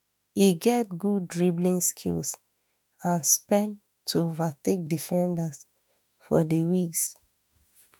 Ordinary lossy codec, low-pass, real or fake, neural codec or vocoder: none; none; fake; autoencoder, 48 kHz, 32 numbers a frame, DAC-VAE, trained on Japanese speech